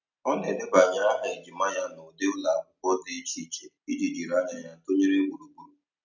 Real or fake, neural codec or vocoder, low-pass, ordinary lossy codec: real; none; 7.2 kHz; none